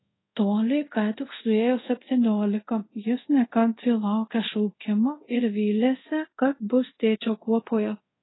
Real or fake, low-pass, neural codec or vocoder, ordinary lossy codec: fake; 7.2 kHz; codec, 24 kHz, 0.5 kbps, DualCodec; AAC, 16 kbps